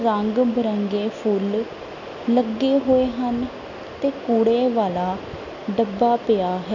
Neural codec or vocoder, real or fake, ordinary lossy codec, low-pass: none; real; none; 7.2 kHz